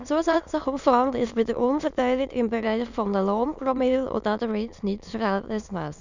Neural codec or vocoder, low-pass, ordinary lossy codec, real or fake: autoencoder, 22.05 kHz, a latent of 192 numbers a frame, VITS, trained on many speakers; 7.2 kHz; none; fake